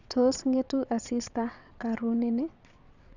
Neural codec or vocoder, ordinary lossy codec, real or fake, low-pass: vocoder, 22.05 kHz, 80 mel bands, WaveNeXt; none; fake; 7.2 kHz